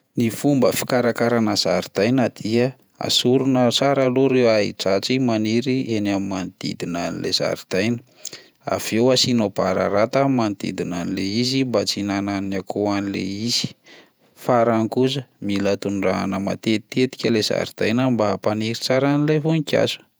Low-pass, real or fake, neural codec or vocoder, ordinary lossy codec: none; fake; vocoder, 48 kHz, 128 mel bands, Vocos; none